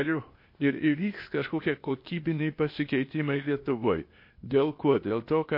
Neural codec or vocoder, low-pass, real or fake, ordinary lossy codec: codec, 16 kHz, 0.8 kbps, ZipCodec; 5.4 kHz; fake; MP3, 32 kbps